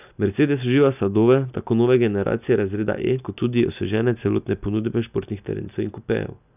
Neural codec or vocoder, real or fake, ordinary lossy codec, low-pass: none; real; none; 3.6 kHz